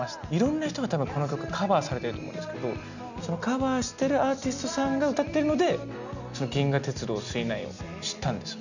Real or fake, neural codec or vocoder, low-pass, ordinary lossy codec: real; none; 7.2 kHz; none